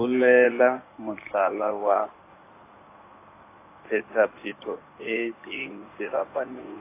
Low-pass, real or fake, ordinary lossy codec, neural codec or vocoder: 3.6 kHz; fake; AAC, 24 kbps; codec, 16 kHz in and 24 kHz out, 2.2 kbps, FireRedTTS-2 codec